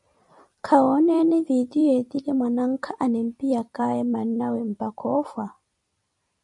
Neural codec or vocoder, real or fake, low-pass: vocoder, 44.1 kHz, 128 mel bands every 256 samples, BigVGAN v2; fake; 10.8 kHz